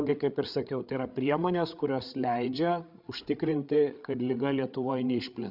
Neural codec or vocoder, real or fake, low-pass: codec, 16 kHz, 8 kbps, FreqCodec, larger model; fake; 5.4 kHz